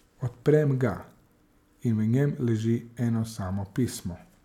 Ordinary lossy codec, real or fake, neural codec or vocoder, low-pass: none; real; none; 19.8 kHz